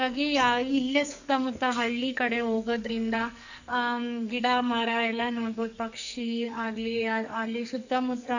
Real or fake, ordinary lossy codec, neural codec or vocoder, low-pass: fake; none; codec, 44.1 kHz, 2.6 kbps, SNAC; 7.2 kHz